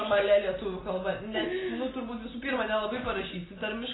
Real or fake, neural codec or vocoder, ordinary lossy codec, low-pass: real; none; AAC, 16 kbps; 7.2 kHz